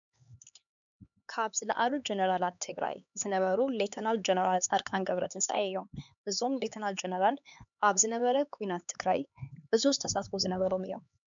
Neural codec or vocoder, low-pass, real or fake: codec, 16 kHz, 4 kbps, X-Codec, HuBERT features, trained on LibriSpeech; 7.2 kHz; fake